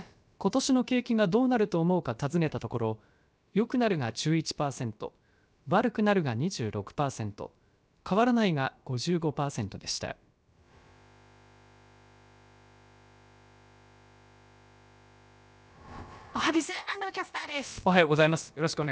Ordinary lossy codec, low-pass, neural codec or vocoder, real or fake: none; none; codec, 16 kHz, about 1 kbps, DyCAST, with the encoder's durations; fake